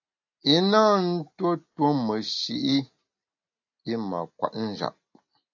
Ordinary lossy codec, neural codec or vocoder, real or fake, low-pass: AAC, 48 kbps; none; real; 7.2 kHz